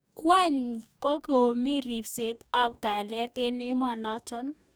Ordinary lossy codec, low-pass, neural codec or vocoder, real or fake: none; none; codec, 44.1 kHz, 2.6 kbps, DAC; fake